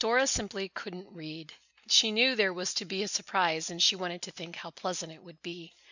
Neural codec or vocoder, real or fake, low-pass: none; real; 7.2 kHz